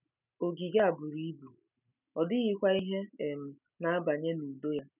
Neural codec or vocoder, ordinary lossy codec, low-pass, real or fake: none; none; 3.6 kHz; real